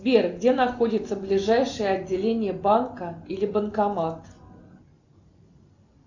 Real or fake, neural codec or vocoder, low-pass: real; none; 7.2 kHz